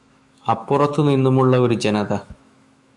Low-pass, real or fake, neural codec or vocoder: 10.8 kHz; fake; autoencoder, 48 kHz, 128 numbers a frame, DAC-VAE, trained on Japanese speech